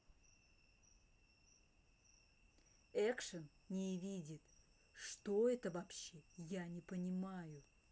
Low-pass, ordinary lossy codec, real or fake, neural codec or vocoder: none; none; real; none